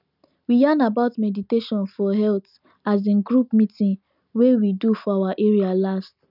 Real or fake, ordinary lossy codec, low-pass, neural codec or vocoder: real; none; 5.4 kHz; none